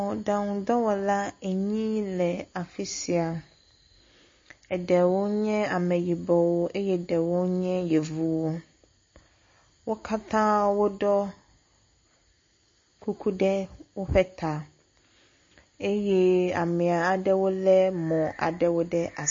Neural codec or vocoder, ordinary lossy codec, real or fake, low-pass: none; MP3, 32 kbps; real; 7.2 kHz